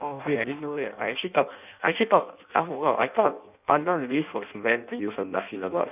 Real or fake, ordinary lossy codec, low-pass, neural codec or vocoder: fake; none; 3.6 kHz; codec, 16 kHz in and 24 kHz out, 0.6 kbps, FireRedTTS-2 codec